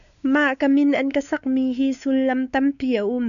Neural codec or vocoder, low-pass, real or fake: codec, 16 kHz, 4 kbps, X-Codec, WavLM features, trained on Multilingual LibriSpeech; 7.2 kHz; fake